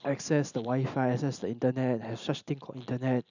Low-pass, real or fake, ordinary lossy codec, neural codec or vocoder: 7.2 kHz; real; none; none